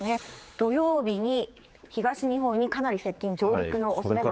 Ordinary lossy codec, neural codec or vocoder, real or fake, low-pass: none; codec, 16 kHz, 4 kbps, X-Codec, HuBERT features, trained on general audio; fake; none